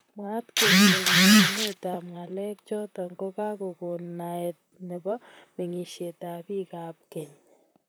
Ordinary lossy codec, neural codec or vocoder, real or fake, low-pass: none; vocoder, 44.1 kHz, 128 mel bands, Pupu-Vocoder; fake; none